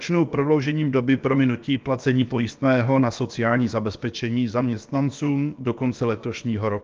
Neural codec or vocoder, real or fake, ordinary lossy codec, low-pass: codec, 16 kHz, about 1 kbps, DyCAST, with the encoder's durations; fake; Opus, 24 kbps; 7.2 kHz